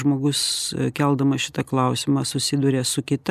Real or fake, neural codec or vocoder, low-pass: real; none; 14.4 kHz